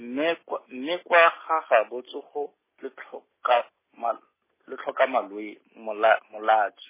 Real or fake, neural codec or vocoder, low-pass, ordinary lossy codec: real; none; 3.6 kHz; MP3, 16 kbps